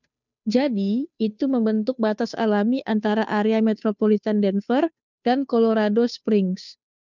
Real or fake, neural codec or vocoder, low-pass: fake; codec, 16 kHz, 2 kbps, FunCodec, trained on Chinese and English, 25 frames a second; 7.2 kHz